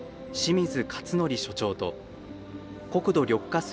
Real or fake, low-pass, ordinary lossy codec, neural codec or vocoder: real; none; none; none